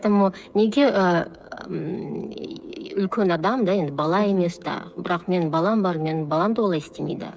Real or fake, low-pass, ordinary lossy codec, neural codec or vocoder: fake; none; none; codec, 16 kHz, 8 kbps, FreqCodec, smaller model